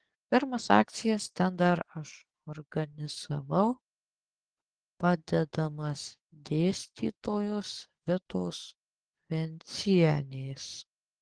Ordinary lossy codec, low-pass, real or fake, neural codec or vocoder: Opus, 16 kbps; 9.9 kHz; fake; codec, 44.1 kHz, 7.8 kbps, DAC